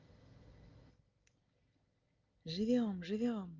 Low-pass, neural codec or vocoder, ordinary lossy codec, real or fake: 7.2 kHz; none; Opus, 24 kbps; real